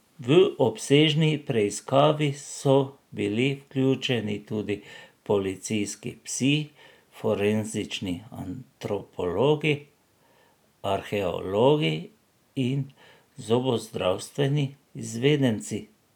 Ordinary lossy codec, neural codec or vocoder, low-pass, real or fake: none; none; 19.8 kHz; real